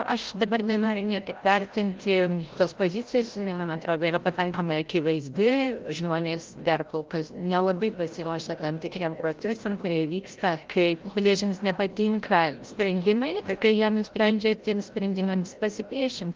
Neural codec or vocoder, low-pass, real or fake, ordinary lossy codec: codec, 16 kHz, 0.5 kbps, FreqCodec, larger model; 7.2 kHz; fake; Opus, 32 kbps